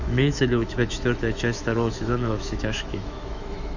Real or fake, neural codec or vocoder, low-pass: fake; autoencoder, 48 kHz, 128 numbers a frame, DAC-VAE, trained on Japanese speech; 7.2 kHz